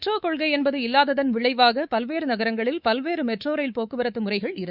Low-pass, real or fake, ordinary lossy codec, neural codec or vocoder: 5.4 kHz; fake; Opus, 64 kbps; codec, 24 kHz, 3.1 kbps, DualCodec